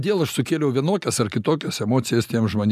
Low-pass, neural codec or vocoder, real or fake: 14.4 kHz; none; real